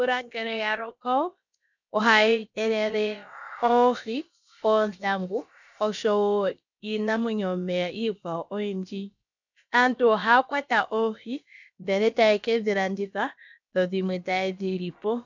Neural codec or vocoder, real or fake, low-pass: codec, 16 kHz, about 1 kbps, DyCAST, with the encoder's durations; fake; 7.2 kHz